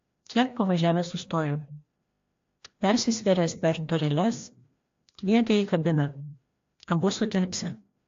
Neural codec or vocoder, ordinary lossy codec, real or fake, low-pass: codec, 16 kHz, 1 kbps, FreqCodec, larger model; AAC, 48 kbps; fake; 7.2 kHz